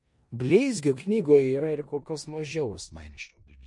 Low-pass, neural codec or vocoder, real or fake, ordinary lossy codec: 10.8 kHz; codec, 16 kHz in and 24 kHz out, 0.9 kbps, LongCat-Audio-Codec, four codebook decoder; fake; MP3, 48 kbps